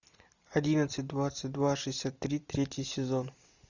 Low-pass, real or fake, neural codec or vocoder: 7.2 kHz; real; none